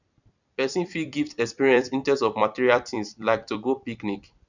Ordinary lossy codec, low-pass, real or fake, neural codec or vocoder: none; 7.2 kHz; fake; vocoder, 44.1 kHz, 128 mel bands every 256 samples, BigVGAN v2